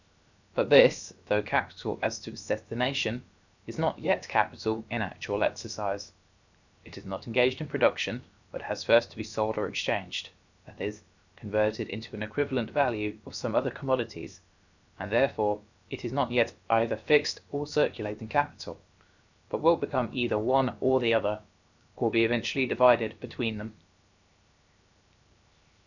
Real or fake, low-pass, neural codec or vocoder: fake; 7.2 kHz; codec, 16 kHz, 0.7 kbps, FocalCodec